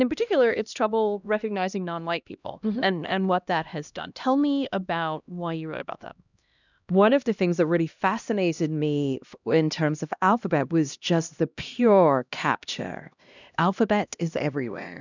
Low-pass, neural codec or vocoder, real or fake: 7.2 kHz; codec, 16 kHz, 1 kbps, X-Codec, HuBERT features, trained on LibriSpeech; fake